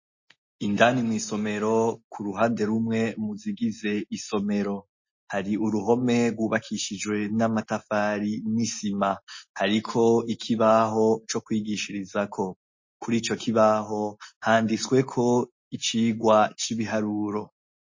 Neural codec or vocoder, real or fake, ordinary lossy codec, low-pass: none; real; MP3, 32 kbps; 7.2 kHz